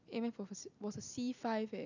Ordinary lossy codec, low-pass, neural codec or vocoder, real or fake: none; 7.2 kHz; none; real